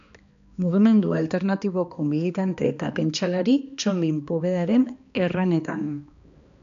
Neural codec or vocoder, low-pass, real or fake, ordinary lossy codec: codec, 16 kHz, 2 kbps, X-Codec, HuBERT features, trained on balanced general audio; 7.2 kHz; fake; MP3, 64 kbps